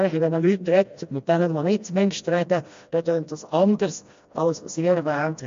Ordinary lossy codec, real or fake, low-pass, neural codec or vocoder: MP3, 48 kbps; fake; 7.2 kHz; codec, 16 kHz, 1 kbps, FreqCodec, smaller model